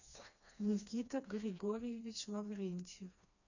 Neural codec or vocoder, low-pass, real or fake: codec, 16 kHz, 2 kbps, FreqCodec, smaller model; 7.2 kHz; fake